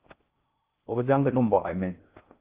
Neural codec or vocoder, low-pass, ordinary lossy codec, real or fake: codec, 16 kHz in and 24 kHz out, 0.6 kbps, FocalCodec, streaming, 4096 codes; 3.6 kHz; Opus, 32 kbps; fake